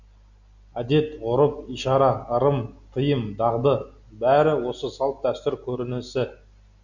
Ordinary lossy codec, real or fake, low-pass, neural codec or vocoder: none; real; 7.2 kHz; none